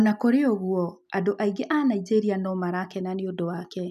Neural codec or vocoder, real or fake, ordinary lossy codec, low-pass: none; real; none; 14.4 kHz